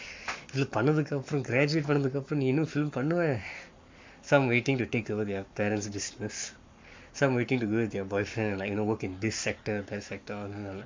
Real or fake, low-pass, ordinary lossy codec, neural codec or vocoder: fake; 7.2 kHz; MP3, 64 kbps; codec, 44.1 kHz, 7.8 kbps, Pupu-Codec